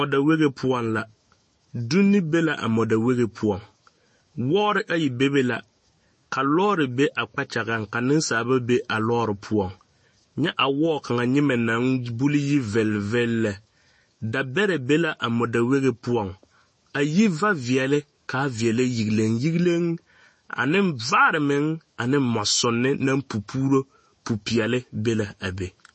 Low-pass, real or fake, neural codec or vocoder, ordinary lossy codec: 10.8 kHz; fake; autoencoder, 48 kHz, 128 numbers a frame, DAC-VAE, trained on Japanese speech; MP3, 32 kbps